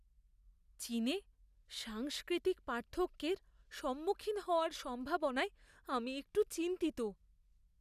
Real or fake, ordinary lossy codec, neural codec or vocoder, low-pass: real; none; none; 14.4 kHz